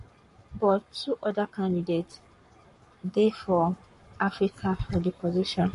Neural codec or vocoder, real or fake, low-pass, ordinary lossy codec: codec, 44.1 kHz, 7.8 kbps, Pupu-Codec; fake; 14.4 kHz; MP3, 48 kbps